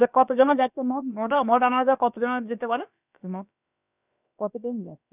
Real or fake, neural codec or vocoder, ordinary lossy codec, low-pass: fake; codec, 16 kHz, about 1 kbps, DyCAST, with the encoder's durations; none; 3.6 kHz